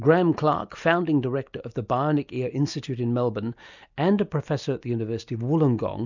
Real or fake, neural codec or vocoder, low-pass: real; none; 7.2 kHz